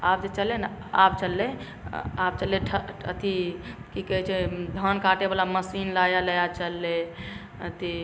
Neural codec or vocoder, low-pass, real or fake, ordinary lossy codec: none; none; real; none